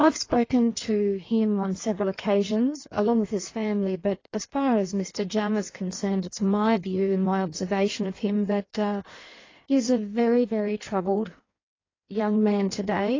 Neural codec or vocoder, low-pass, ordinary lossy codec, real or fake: codec, 16 kHz in and 24 kHz out, 1.1 kbps, FireRedTTS-2 codec; 7.2 kHz; AAC, 32 kbps; fake